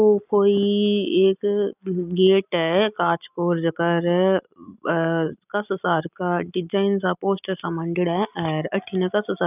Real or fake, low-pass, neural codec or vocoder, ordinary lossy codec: real; 3.6 kHz; none; none